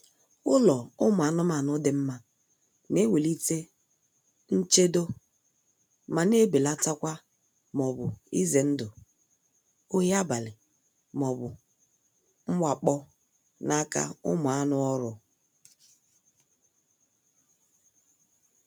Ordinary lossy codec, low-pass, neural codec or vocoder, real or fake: none; none; none; real